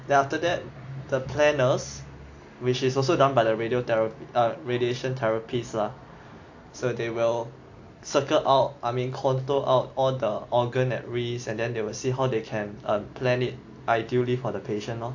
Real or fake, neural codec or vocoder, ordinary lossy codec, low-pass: real; none; AAC, 48 kbps; 7.2 kHz